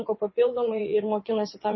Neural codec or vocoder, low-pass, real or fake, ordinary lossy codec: vocoder, 22.05 kHz, 80 mel bands, WaveNeXt; 7.2 kHz; fake; MP3, 24 kbps